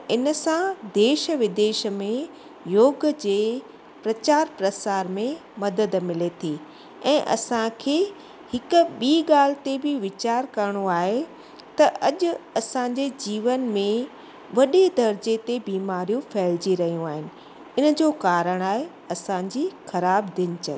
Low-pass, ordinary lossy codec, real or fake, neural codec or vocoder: none; none; real; none